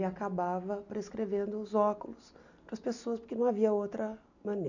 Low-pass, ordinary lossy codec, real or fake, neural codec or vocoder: 7.2 kHz; none; real; none